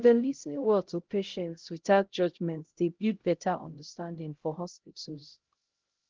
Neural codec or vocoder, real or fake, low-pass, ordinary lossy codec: codec, 16 kHz, 0.5 kbps, X-Codec, HuBERT features, trained on LibriSpeech; fake; 7.2 kHz; Opus, 16 kbps